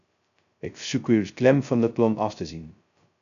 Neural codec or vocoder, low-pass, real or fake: codec, 16 kHz, 0.2 kbps, FocalCodec; 7.2 kHz; fake